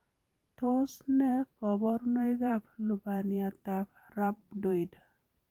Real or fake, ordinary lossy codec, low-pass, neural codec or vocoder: fake; Opus, 32 kbps; 19.8 kHz; vocoder, 44.1 kHz, 128 mel bands every 512 samples, BigVGAN v2